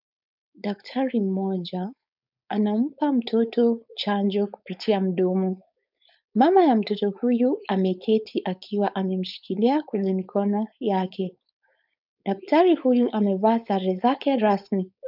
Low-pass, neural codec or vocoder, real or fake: 5.4 kHz; codec, 16 kHz, 4.8 kbps, FACodec; fake